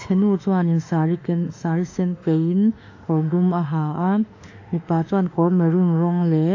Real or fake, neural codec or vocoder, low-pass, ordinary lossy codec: fake; autoencoder, 48 kHz, 32 numbers a frame, DAC-VAE, trained on Japanese speech; 7.2 kHz; AAC, 48 kbps